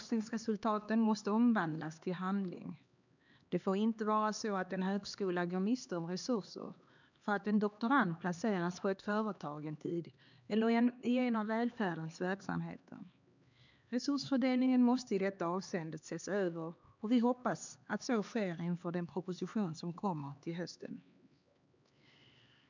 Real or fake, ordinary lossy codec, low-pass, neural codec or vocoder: fake; none; 7.2 kHz; codec, 16 kHz, 2 kbps, X-Codec, HuBERT features, trained on LibriSpeech